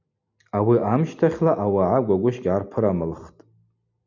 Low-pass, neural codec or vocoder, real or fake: 7.2 kHz; none; real